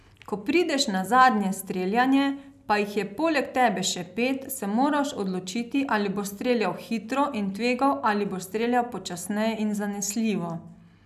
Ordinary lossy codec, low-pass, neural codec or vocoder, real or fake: none; 14.4 kHz; none; real